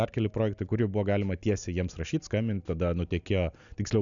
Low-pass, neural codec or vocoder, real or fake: 7.2 kHz; none; real